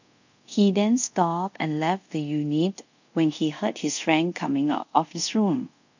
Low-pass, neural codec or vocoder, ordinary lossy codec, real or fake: 7.2 kHz; codec, 24 kHz, 0.5 kbps, DualCodec; none; fake